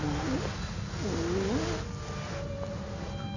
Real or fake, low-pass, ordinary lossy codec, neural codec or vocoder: real; 7.2 kHz; none; none